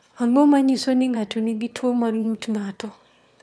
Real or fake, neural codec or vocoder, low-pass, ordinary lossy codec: fake; autoencoder, 22.05 kHz, a latent of 192 numbers a frame, VITS, trained on one speaker; none; none